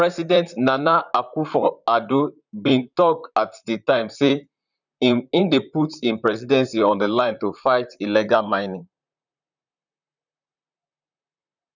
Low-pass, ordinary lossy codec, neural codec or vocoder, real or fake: 7.2 kHz; none; vocoder, 44.1 kHz, 128 mel bands, Pupu-Vocoder; fake